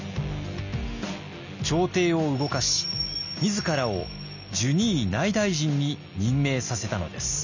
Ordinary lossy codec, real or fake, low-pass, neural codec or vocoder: none; real; 7.2 kHz; none